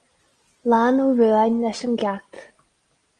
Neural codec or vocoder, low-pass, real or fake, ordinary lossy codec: none; 9.9 kHz; real; Opus, 24 kbps